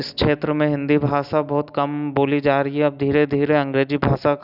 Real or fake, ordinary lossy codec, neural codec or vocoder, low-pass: real; none; none; 5.4 kHz